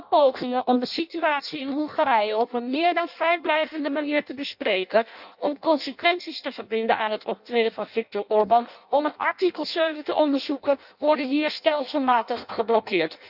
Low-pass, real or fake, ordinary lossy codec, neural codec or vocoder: 5.4 kHz; fake; none; codec, 16 kHz in and 24 kHz out, 0.6 kbps, FireRedTTS-2 codec